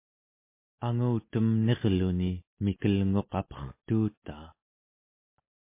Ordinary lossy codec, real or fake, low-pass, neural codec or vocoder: MP3, 24 kbps; real; 3.6 kHz; none